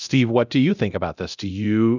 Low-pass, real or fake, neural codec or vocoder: 7.2 kHz; fake; codec, 24 kHz, 0.9 kbps, DualCodec